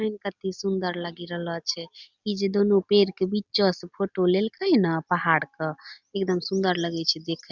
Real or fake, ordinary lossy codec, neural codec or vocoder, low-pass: real; Opus, 64 kbps; none; 7.2 kHz